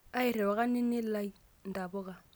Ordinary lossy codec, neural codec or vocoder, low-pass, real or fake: none; none; none; real